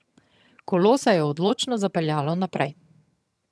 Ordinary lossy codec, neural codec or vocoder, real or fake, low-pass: none; vocoder, 22.05 kHz, 80 mel bands, HiFi-GAN; fake; none